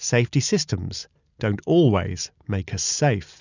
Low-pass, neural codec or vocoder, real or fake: 7.2 kHz; none; real